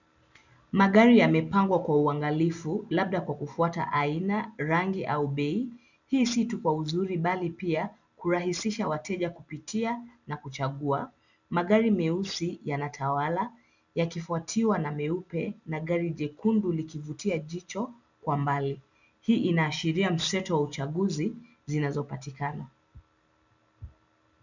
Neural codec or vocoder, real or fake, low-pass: none; real; 7.2 kHz